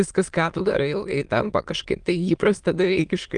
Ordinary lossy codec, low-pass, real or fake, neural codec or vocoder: Opus, 32 kbps; 9.9 kHz; fake; autoencoder, 22.05 kHz, a latent of 192 numbers a frame, VITS, trained on many speakers